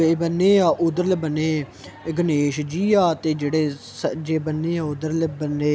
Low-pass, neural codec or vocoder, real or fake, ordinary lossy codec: none; none; real; none